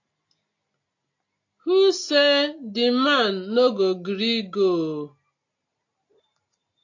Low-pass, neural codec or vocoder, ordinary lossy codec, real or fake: 7.2 kHz; none; AAC, 48 kbps; real